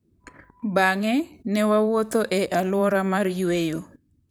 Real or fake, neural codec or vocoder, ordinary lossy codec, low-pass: fake; vocoder, 44.1 kHz, 128 mel bands, Pupu-Vocoder; none; none